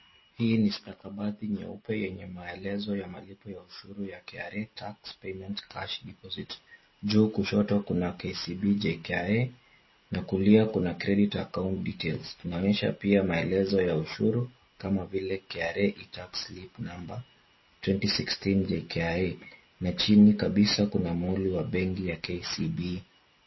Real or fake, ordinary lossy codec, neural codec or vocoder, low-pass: real; MP3, 24 kbps; none; 7.2 kHz